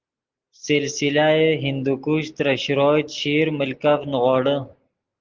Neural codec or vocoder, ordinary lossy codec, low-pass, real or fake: none; Opus, 16 kbps; 7.2 kHz; real